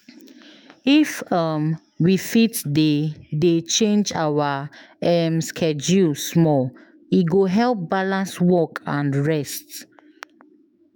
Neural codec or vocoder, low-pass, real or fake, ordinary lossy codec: autoencoder, 48 kHz, 128 numbers a frame, DAC-VAE, trained on Japanese speech; none; fake; none